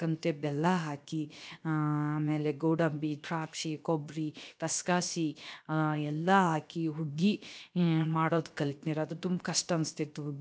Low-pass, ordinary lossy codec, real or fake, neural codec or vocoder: none; none; fake; codec, 16 kHz, 0.7 kbps, FocalCodec